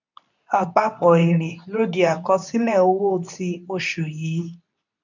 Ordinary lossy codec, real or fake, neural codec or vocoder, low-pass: none; fake; codec, 24 kHz, 0.9 kbps, WavTokenizer, medium speech release version 2; 7.2 kHz